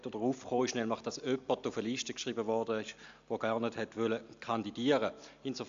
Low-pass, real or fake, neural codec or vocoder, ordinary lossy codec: 7.2 kHz; real; none; AAC, 64 kbps